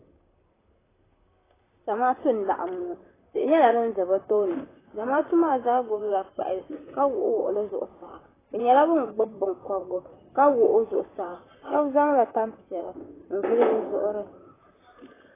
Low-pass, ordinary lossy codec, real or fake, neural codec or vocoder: 3.6 kHz; AAC, 16 kbps; fake; vocoder, 44.1 kHz, 128 mel bands, Pupu-Vocoder